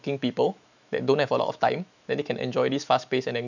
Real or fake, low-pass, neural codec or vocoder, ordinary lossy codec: fake; 7.2 kHz; autoencoder, 48 kHz, 128 numbers a frame, DAC-VAE, trained on Japanese speech; none